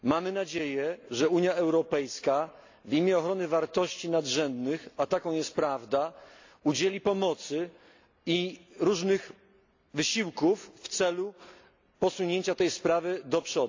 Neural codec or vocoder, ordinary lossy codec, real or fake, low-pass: none; AAC, 48 kbps; real; 7.2 kHz